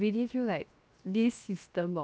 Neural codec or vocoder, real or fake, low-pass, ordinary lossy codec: codec, 16 kHz, 0.7 kbps, FocalCodec; fake; none; none